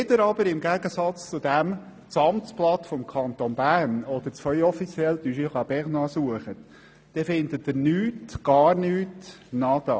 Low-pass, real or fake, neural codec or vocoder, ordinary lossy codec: none; real; none; none